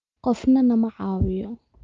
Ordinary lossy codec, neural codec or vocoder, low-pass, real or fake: Opus, 32 kbps; none; 7.2 kHz; real